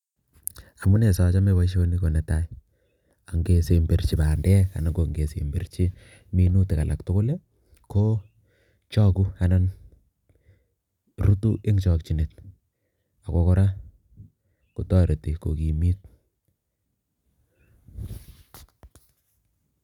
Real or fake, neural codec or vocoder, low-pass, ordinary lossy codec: real; none; 19.8 kHz; none